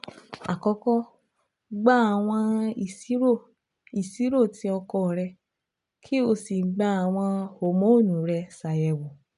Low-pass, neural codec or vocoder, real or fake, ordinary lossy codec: 10.8 kHz; none; real; none